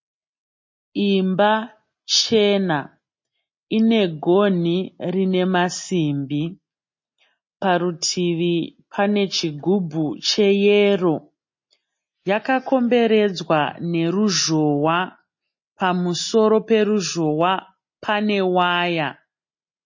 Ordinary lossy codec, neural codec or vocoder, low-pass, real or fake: MP3, 32 kbps; none; 7.2 kHz; real